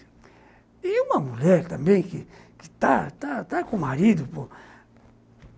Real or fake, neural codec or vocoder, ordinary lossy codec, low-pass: real; none; none; none